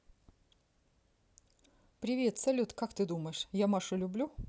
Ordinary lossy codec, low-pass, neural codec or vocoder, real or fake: none; none; none; real